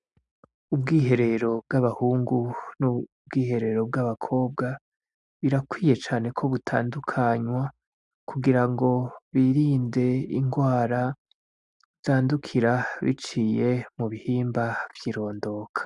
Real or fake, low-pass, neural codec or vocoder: real; 10.8 kHz; none